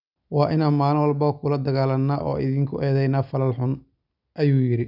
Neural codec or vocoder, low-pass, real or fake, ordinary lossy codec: none; 5.4 kHz; real; none